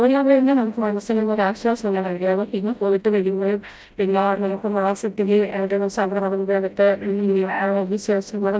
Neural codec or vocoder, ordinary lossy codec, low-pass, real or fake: codec, 16 kHz, 0.5 kbps, FreqCodec, smaller model; none; none; fake